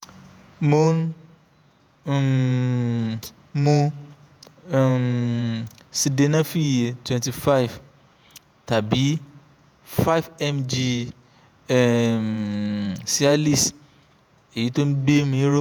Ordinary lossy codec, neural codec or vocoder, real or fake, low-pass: none; vocoder, 48 kHz, 128 mel bands, Vocos; fake; none